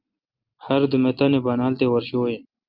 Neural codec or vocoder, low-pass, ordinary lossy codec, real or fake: none; 5.4 kHz; Opus, 24 kbps; real